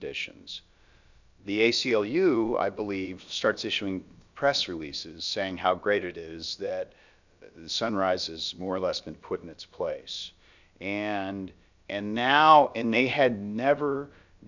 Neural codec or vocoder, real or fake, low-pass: codec, 16 kHz, about 1 kbps, DyCAST, with the encoder's durations; fake; 7.2 kHz